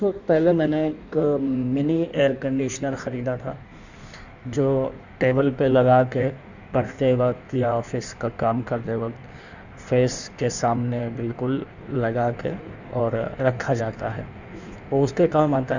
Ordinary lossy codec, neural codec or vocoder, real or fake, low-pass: none; codec, 16 kHz in and 24 kHz out, 1.1 kbps, FireRedTTS-2 codec; fake; 7.2 kHz